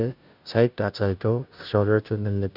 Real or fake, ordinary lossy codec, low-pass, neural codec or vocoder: fake; none; 5.4 kHz; codec, 16 kHz, 0.5 kbps, FunCodec, trained on Chinese and English, 25 frames a second